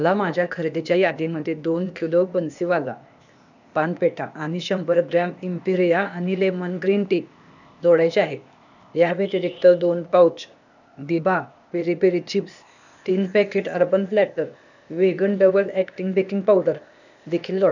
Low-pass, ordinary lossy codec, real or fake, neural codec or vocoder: 7.2 kHz; none; fake; codec, 16 kHz, 0.8 kbps, ZipCodec